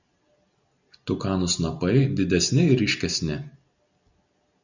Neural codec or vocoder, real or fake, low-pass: none; real; 7.2 kHz